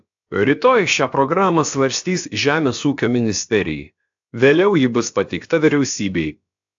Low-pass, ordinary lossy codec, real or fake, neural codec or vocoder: 7.2 kHz; AAC, 64 kbps; fake; codec, 16 kHz, about 1 kbps, DyCAST, with the encoder's durations